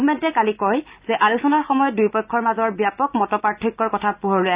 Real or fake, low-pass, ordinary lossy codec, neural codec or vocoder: real; 3.6 kHz; Opus, 64 kbps; none